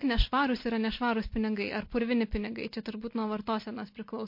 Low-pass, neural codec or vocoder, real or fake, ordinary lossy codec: 5.4 kHz; none; real; MP3, 32 kbps